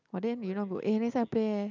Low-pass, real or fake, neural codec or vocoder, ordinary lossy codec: 7.2 kHz; real; none; none